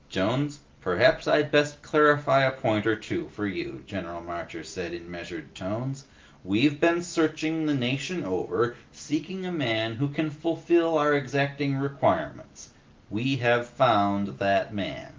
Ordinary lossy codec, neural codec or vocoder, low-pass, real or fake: Opus, 32 kbps; none; 7.2 kHz; real